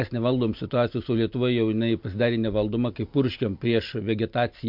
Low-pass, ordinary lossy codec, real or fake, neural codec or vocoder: 5.4 kHz; MP3, 48 kbps; real; none